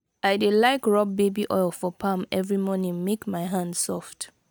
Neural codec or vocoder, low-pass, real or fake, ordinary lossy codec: none; none; real; none